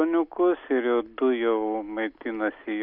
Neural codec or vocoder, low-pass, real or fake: none; 5.4 kHz; real